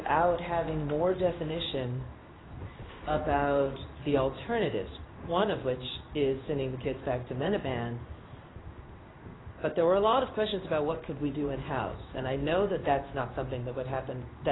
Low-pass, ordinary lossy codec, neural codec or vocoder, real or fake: 7.2 kHz; AAC, 16 kbps; codec, 16 kHz in and 24 kHz out, 1 kbps, XY-Tokenizer; fake